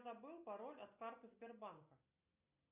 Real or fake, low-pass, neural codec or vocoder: real; 3.6 kHz; none